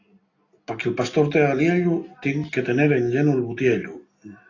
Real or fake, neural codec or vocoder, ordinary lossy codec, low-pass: real; none; AAC, 32 kbps; 7.2 kHz